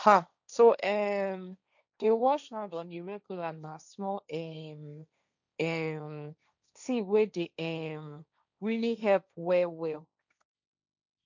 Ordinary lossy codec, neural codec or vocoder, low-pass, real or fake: none; codec, 16 kHz, 1.1 kbps, Voila-Tokenizer; 7.2 kHz; fake